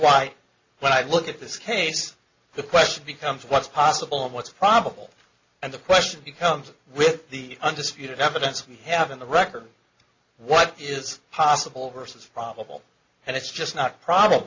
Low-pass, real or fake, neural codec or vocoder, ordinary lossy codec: 7.2 kHz; real; none; MP3, 64 kbps